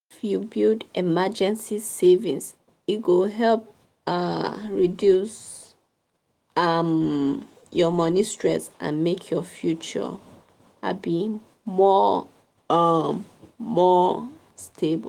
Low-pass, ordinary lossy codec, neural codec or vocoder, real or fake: 19.8 kHz; Opus, 24 kbps; autoencoder, 48 kHz, 128 numbers a frame, DAC-VAE, trained on Japanese speech; fake